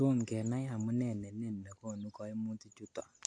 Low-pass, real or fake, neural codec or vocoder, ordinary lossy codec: 9.9 kHz; real; none; AAC, 48 kbps